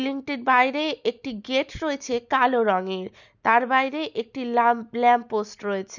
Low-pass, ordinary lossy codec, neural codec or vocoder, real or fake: 7.2 kHz; none; none; real